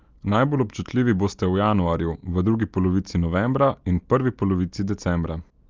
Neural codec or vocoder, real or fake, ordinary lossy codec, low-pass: none; real; Opus, 32 kbps; 7.2 kHz